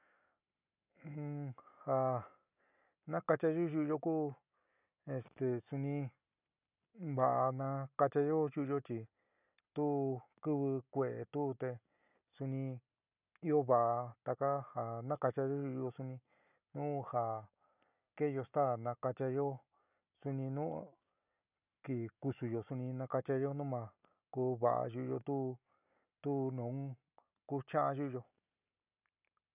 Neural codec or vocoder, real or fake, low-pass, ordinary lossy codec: none; real; 3.6 kHz; none